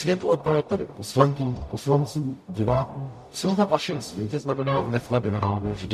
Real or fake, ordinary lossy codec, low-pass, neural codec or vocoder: fake; MP3, 64 kbps; 14.4 kHz; codec, 44.1 kHz, 0.9 kbps, DAC